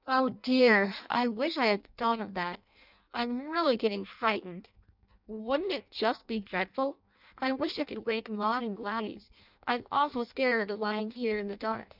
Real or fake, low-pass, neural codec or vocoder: fake; 5.4 kHz; codec, 16 kHz in and 24 kHz out, 0.6 kbps, FireRedTTS-2 codec